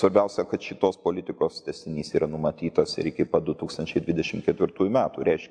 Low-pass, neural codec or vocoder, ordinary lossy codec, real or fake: 9.9 kHz; none; AAC, 64 kbps; real